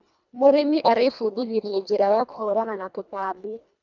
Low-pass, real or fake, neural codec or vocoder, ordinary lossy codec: 7.2 kHz; fake; codec, 24 kHz, 1.5 kbps, HILCodec; none